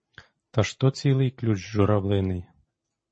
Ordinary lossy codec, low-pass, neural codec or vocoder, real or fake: MP3, 32 kbps; 9.9 kHz; vocoder, 44.1 kHz, 128 mel bands every 256 samples, BigVGAN v2; fake